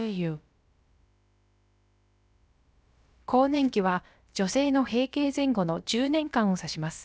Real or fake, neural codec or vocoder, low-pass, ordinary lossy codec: fake; codec, 16 kHz, about 1 kbps, DyCAST, with the encoder's durations; none; none